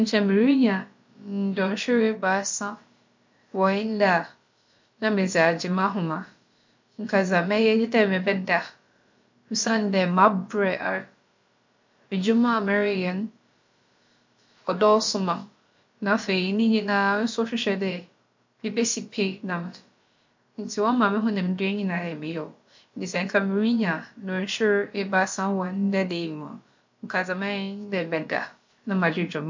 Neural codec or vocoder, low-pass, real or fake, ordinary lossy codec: codec, 16 kHz, about 1 kbps, DyCAST, with the encoder's durations; 7.2 kHz; fake; MP3, 48 kbps